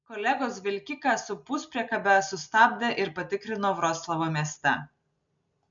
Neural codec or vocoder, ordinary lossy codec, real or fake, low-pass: none; MP3, 96 kbps; real; 7.2 kHz